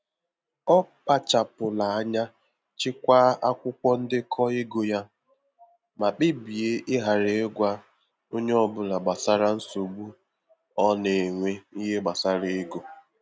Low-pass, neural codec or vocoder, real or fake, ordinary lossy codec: none; none; real; none